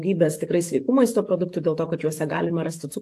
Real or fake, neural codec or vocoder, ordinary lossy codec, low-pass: fake; codec, 44.1 kHz, 7.8 kbps, DAC; AAC, 64 kbps; 14.4 kHz